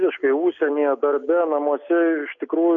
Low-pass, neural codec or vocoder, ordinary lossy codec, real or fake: 7.2 kHz; none; MP3, 64 kbps; real